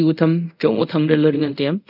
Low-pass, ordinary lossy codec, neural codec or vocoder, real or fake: 5.4 kHz; none; codec, 24 kHz, 0.5 kbps, DualCodec; fake